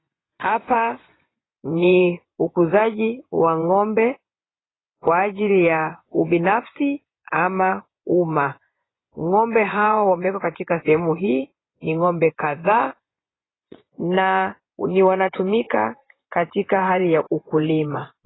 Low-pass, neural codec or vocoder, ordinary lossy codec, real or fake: 7.2 kHz; vocoder, 24 kHz, 100 mel bands, Vocos; AAC, 16 kbps; fake